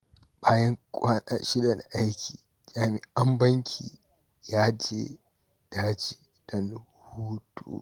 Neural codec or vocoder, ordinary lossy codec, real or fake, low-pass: none; Opus, 24 kbps; real; 19.8 kHz